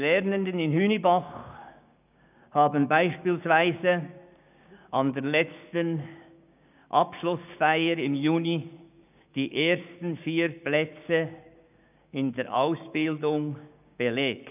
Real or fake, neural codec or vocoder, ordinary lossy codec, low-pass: fake; codec, 16 kHz, 6 kbps, DAC; none; 3.6 kHz